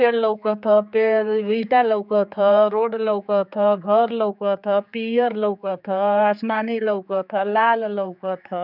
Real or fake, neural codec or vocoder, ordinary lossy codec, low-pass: fake; codec, 16 kHz, 4 kbps, X-Codec, HuBERT features, trained on general audio; none; 5.4 kHz